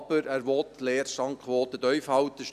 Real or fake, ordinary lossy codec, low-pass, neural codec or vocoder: real; none; 14.4 kHz; none